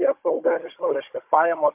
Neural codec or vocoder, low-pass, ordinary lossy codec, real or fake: codec, 16 kHz, 16 kbps, FunCodec, trained on Chinese and English, 50 frames a second; 3.6 kHz; MP3, 32 kbps; fake